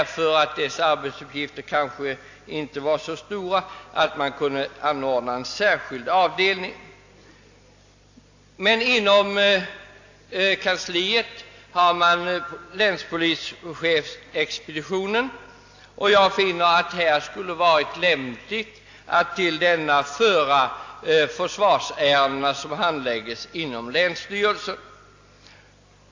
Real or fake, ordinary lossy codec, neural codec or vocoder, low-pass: real; AAC, 48 kbps; none; 7.2 kHz